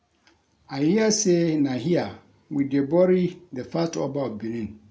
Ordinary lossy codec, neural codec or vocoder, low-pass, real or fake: none; none; none; real